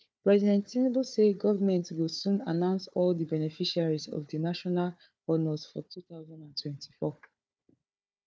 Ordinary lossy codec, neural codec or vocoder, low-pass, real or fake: none; codec, 16 kHz, 4 kbps, FunCodec, trained on Chinese and English, 50 frames a second; none; fake